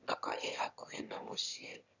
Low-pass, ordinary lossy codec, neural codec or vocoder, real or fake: 7.2 kHz; none; autoencoder, 22.05 kHz, a latent of 192 numbers a frame, VITS, trained on one speaker; fake